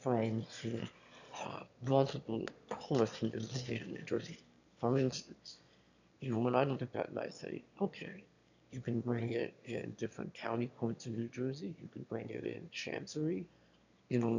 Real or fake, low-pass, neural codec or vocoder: fake; 7.2 kHz; autoencoder, 22.05 kHz, a latent of 192 numbers a frame, VITS, trained on one speaker